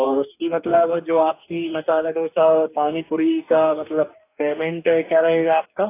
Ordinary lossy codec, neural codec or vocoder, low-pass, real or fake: AAC, 24 kbps; codec, 44.1 kHz, 2.6 kbps, DAC; 3.6 kHz; fake